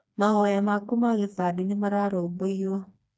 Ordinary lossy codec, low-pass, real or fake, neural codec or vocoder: none; none; fake; codec, 16 kHz, 2 kbps, FreqCodec, smaller model